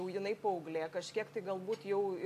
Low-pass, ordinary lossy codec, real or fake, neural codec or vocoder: 14.4 kHz; AAC, 64 kbps; real; none